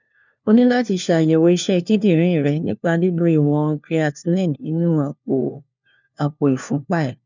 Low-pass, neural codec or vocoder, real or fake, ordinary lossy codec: 7.2 kHz; codec, 16 kHz, 1 kbps, FunCodec, trained on LibriTTS, 50 frames a second; fake; none